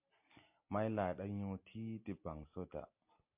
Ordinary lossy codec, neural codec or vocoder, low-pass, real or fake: MP3, 32 kbps; none; 3.6 kHz; real